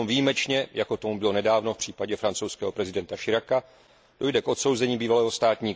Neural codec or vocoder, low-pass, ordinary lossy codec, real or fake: none; none; none; real